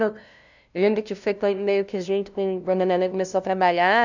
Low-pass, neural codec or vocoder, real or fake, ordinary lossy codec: 7.2 kHz; codec, 16 kHz, 0.5 kbps, FunCodec, trained on LibriTTS, 25 frames a second; fake; none